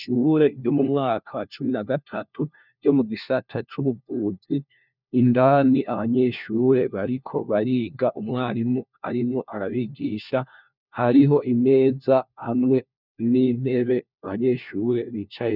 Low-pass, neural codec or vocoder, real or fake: 5.4 kHz; codec, 16 kHz, 1 kbps, FunCodec, trained on LibriTTS, 50 frames a second; fake